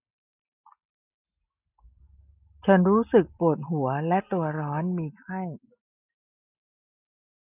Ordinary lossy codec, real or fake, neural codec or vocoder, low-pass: none; real; none; 3.6 kHz